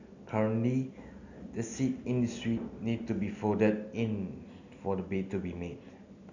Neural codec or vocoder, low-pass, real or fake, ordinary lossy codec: none; 7.2 kHz; real; none